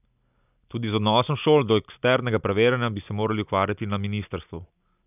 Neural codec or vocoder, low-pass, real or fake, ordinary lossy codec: none; 3.6 kHz; real; none